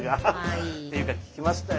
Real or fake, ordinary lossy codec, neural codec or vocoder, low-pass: real; none; none; none